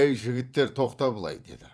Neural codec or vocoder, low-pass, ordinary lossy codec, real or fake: vocoder, 22.05 kHz, 80 mel bands, Vocos; none; none; fake